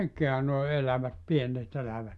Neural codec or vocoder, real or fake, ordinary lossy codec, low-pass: none; real; none; none